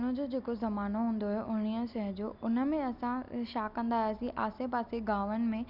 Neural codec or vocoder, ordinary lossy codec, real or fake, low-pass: none; none; real; 5.4 kHz